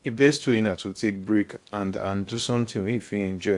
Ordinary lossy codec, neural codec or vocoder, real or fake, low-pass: AAC, 64 kbps; codec, 16 kHz in and 24 kHz out, 0.6 kbps, FocalCodec, streaming, 2048 codes; fake; 10.8 kHz